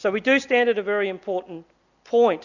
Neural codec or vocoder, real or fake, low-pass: none; real; 7.2 kHz